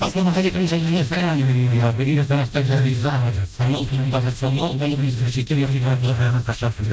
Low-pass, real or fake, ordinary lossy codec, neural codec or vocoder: none; fake; none; codec, 16 kHz, 0.5 kbps, FreqCodec, smaller model